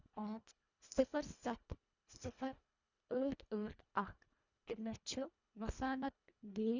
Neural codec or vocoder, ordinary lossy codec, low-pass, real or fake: codec, 24 kHz, 1.5 kbps, HILCodec; none; 7.2 kHz; fake